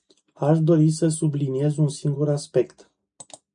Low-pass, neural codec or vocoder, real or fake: 9.9 kHz; none; real